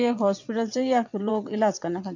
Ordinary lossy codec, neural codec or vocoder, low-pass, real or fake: MP3, 64 kbps; vocoder, 22.05 kHz, 80 mel bands, WaveNeXt; 7.2 kHz; fake